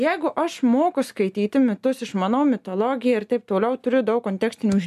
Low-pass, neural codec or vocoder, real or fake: 14.4 kHz; none; real